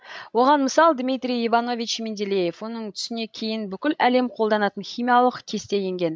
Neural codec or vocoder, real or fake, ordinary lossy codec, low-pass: codec, 16 kHz, 16 kbps, FreqCodec, larger model; fake; none; none